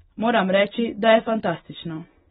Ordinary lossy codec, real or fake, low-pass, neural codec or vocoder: AAC, 16 kbps; real; 19.8 kHz; none